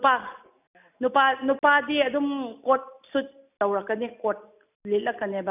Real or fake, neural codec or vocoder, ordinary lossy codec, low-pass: real; none; none; 3.6 kHz